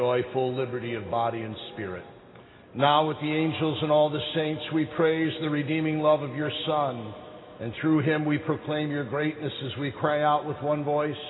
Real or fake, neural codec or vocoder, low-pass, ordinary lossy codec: real; none; 7.2 kHz; AAC, 16 kbps